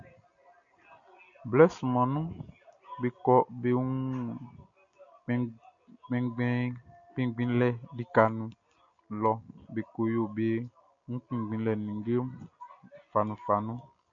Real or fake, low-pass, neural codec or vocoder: real; 7.2 kHz; none